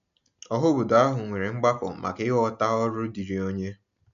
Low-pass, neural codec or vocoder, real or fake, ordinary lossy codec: 7.2 kHz; none; real; none